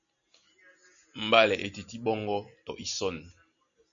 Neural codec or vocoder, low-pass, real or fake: none; 7.2 kHz; real